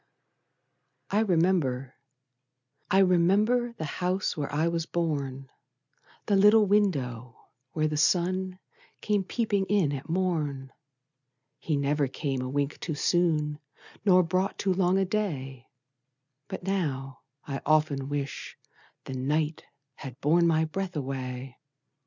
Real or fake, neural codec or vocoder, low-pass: real; none; 7.2 kHz